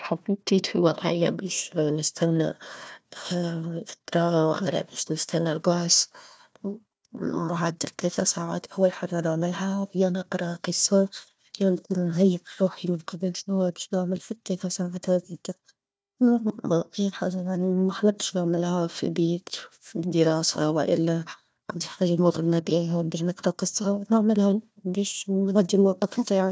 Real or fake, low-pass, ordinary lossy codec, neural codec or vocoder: fake; none; none; codec, 16 kHz, 1 kbps, FunCodec, trained on Chinese and English, 50 frames a second